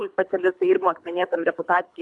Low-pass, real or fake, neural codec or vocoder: 10.8 kHz; fake; codec, 24 kHz, 3 kbps, HILCodec